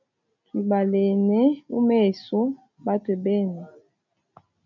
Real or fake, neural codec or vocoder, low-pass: real; none; 7.2 kHz